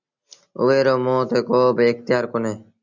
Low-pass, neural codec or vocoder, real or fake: 7.2 kHz; none; real